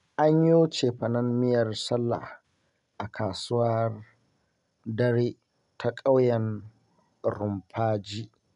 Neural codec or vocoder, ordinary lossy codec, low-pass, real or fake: none; none; 10.8 kHz; real